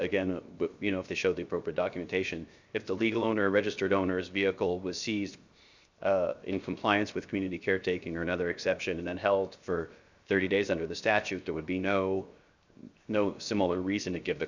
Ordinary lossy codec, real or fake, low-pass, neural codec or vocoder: Opus, 64 kbps; fake; 7.2 kHz; codec, 16 kHz, 0.7 kbps, FocalCodec